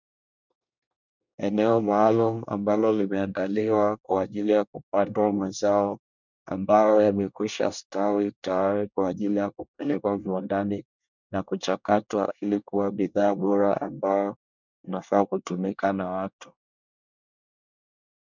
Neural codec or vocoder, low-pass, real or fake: codec, 24 kHz, 1 kbps, SNAC; 7.2 kHz; fake